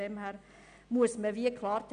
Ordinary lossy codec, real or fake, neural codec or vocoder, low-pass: none; real; none; 9.9 kHz